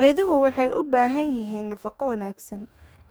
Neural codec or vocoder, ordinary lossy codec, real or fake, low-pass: codec, 44.1 kHz, 2.6 kbps, DAC; none; fake; none